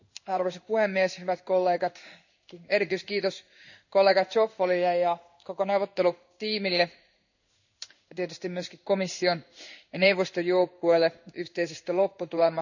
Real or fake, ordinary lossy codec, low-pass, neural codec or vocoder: fake; none; 7.2 kHz; codec, 16 kHz in and 24 kHz out, 1 kbps, XY-Tokenizer